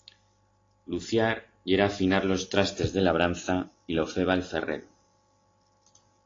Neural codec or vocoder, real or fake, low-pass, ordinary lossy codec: none; real; 7.2 kHz; AAC, 32 kbps